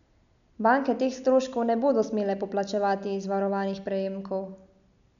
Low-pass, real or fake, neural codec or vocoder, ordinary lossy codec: 7.2 kHz; real; none; none